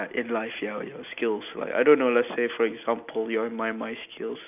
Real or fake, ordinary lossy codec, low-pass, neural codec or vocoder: real; none; 3.6 kHz; none